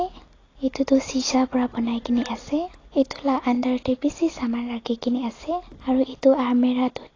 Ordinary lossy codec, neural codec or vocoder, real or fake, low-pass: AAC, 32 kbps; none; real; 7.2 kHz